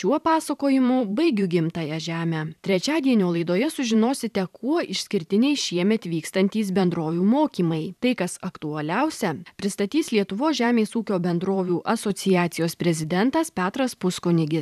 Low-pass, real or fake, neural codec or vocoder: 14.4 kHz; fake; vocoder, 44.1 kHz, 128 mel bands every 512 samples, BigVGAN v2